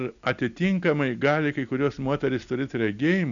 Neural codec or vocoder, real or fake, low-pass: none; real; 7.2 kHz